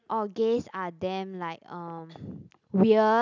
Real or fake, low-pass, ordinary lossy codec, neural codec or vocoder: real; 7.2 kHz; none; none